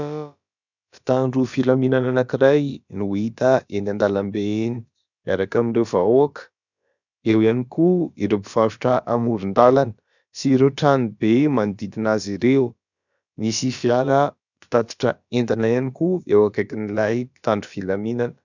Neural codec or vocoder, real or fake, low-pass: codec, 16 kHz, about 1 kbps, DyCAST, with the encoder's durations; fake; 7.2 kHz